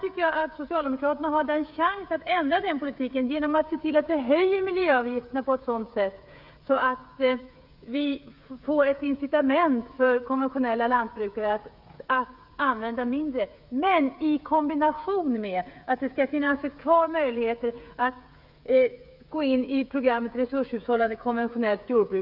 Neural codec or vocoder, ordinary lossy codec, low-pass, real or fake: codec, 16 kHz, 8 kbps, FreqCodec, smaller model; none; 5.4 kHz; fake